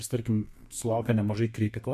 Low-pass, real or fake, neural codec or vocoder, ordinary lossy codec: 14.4 kHz; fake; codec, 32 kHz, 1.9 kbps, SNAC; MP3, 64 kbps